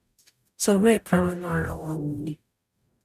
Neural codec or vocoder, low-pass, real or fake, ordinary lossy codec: codec, 44.1 kHz, 0.9 kbps, DAC; 14.4 kHz; fake; none